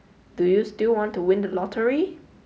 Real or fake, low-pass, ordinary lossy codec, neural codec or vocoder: real; none; none; none